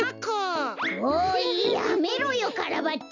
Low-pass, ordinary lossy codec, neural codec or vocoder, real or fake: 7.2 kHz; none; none; real